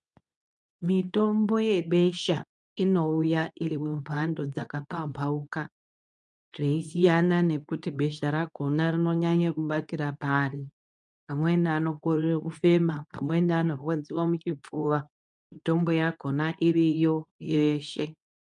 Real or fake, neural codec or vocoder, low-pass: fake; codec, 24 kHz, 0.9 kbps, WavTokenizer, medium speech release version 2; 10.8 kHz